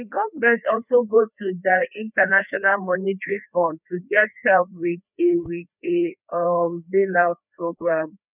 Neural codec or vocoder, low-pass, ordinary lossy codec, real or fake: codec, 16 kHz, 2 kbps, FreqCodec, larger model; 3.6 kHz; none; fake